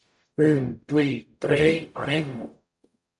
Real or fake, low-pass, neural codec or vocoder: fake; 10.8 kHz; codec, 44.1 kHz, 0.9 kbps, DAC